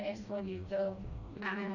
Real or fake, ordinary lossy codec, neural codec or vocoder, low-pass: fake; none; codec, 16 kHz, 1 kbps, FreqCodec, smaller model; 7.2 kHz